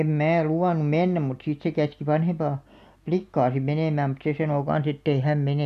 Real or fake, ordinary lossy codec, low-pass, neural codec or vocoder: real; none; 14.4 kHz; none